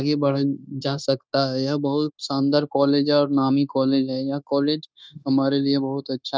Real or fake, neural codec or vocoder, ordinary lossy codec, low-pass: fake; codec, 16 kHz, 0.9 kbps, LongCat-Audio-Codec; none; none